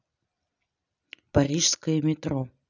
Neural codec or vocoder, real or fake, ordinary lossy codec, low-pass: none; real; none; 7.2 kHz